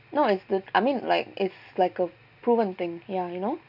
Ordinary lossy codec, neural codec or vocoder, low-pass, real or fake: none; none; 5.4 kHz; real